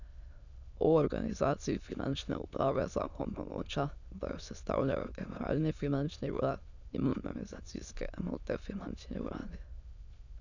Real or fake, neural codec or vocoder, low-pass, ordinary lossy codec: fake; autoencoder, 22.05 kHz, a latent of 192 numbers a frame, VITS, trained on many speakers; 7.2 kHz; none